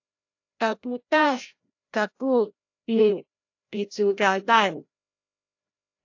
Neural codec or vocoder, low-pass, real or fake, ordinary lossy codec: codec, 16 kHz, 0.5 kbps, FreqCodec, larger model; 7.2 kHz; fake; AAC, 48 kbps